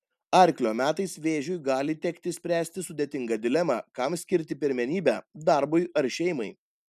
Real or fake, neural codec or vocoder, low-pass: real; none; 14.4 kHz